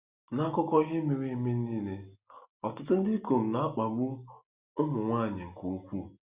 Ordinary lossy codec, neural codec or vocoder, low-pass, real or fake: Opus, 64 kbps; none; 3.6 kHz; real